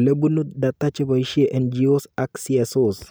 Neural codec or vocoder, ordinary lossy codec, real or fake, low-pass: none; none; real; none